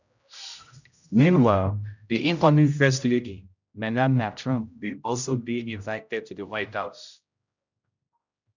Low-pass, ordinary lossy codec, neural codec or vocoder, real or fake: 7.2 kHz; none; codec, 16 kHz, 0.5 kbps, X-Codec, HuBERT features, trained on general audio; fake